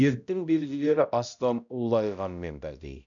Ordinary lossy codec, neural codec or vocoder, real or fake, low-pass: none; codec, 16 kHz, 0.5 kbps, X-Codec, HuBERT features, trained on balanced general audio; fake; 7.2 kHz